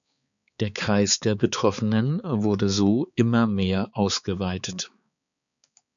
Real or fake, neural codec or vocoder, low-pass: fake; codec, 16 kHz, 4 kbps, X-Codec, HuBERT features, trained on balanced general audio; 7.2 kHz